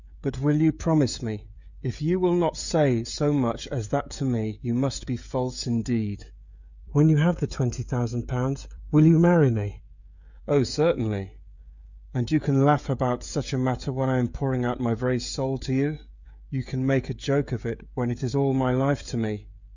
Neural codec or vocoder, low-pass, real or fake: codec, 16 kHz, 16 kbps, FreqCodec, smaller model; 7.2 kHz; fake